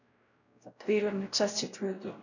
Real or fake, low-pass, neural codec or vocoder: fake; 7.2 kHz; codec, 16 kHz, 0.5 kbps, X-Codec, WavLM features, trained on Multilingual LibriSpeech